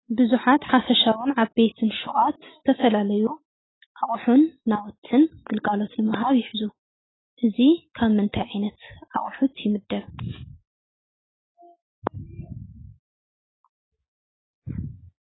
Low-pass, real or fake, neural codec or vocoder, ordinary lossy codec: 7.2 kHz; real; none; AAC, 16 kbps